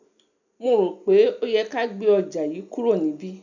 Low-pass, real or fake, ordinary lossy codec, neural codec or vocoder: 7.2 kHz; real; none; none